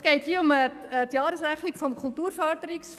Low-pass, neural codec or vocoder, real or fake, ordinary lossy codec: 14.4 kHz; codec, 44.1 kHz, 7.8 kbps, DAC; fake; none